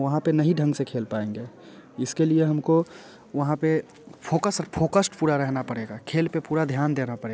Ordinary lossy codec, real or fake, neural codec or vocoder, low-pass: none; real; none; none